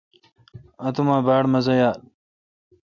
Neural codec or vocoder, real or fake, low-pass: none; real; 7.2 kHz